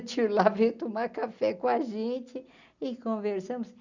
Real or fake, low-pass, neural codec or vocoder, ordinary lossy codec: real; 7.2 kHz; none; none